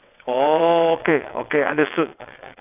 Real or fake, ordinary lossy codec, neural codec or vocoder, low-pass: fake; none; vocoder, 22.05 kHz, 80 mel bands, WaveNeXt; 3.6 kHz